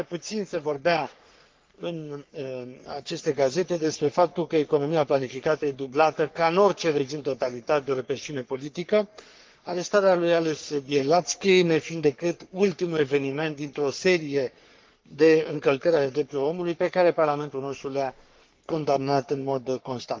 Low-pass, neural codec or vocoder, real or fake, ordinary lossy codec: 7.2 kHz; codec, 44.1 kHz, 3.4 kbps, Pupu-Codec; fake; Opus, 32 kbps